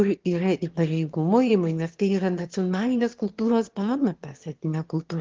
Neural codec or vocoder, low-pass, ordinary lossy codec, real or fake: autoencoder, 22.05 kHz, a latent of 192 numbers a frame, VITS, trained on one speaker; 7.2 kHz; Opus, 16 kbps; fake